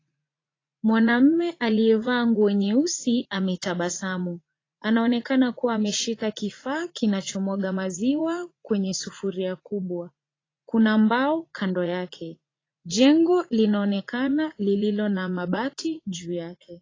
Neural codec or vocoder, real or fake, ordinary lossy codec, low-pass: vocoder, 44.1 kHz, 80 mel bands, Vocos; fake; AAC, 32 kbps; 7.2 kHz